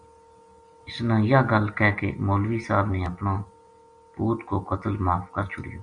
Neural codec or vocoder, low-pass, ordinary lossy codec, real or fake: none; 9.9 kHz; Opus, 64 kbps; real